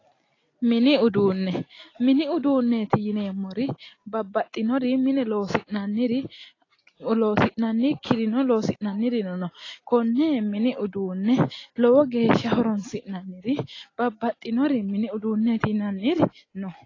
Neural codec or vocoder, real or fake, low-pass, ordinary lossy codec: none; real; 7.2 kHz; AAC, 32 kbps